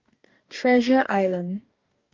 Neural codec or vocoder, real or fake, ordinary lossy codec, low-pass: codec, 44.1 kHz, 2.6 kbps, DAC; fake; Opus, 32 kbps; 7.2 kHz